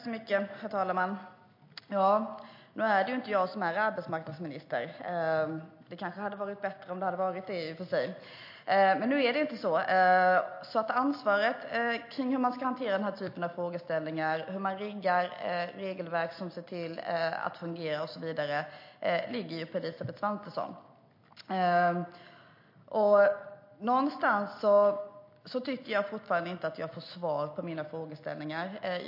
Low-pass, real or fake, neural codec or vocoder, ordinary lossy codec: 5.4 kHz; real; none; MP3, 32 kbps